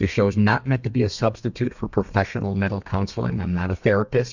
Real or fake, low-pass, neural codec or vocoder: fake; 7.2 kHz; codec, 32 kHz, 1.9 kbps, SNAC